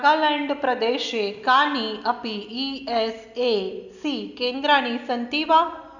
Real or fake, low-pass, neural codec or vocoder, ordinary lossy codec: real; 7.2 kHz; none; none